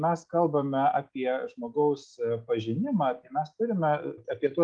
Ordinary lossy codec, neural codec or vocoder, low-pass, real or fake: Opus, 32 kbps; none; 9.9 kHz; real